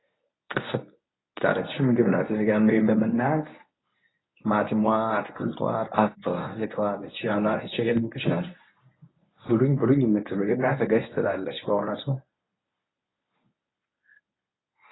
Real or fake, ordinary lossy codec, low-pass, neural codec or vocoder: fake; AAC, 16 kbps; 7.2 kHz; codec, 24 kHz, 0.9 kbps, WavTokenizer, medium speech release version 1